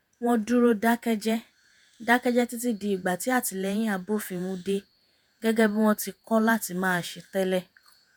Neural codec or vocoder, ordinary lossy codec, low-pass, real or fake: vocoder, 48 kHz, 128 mel bands, Vocos; none; none; fake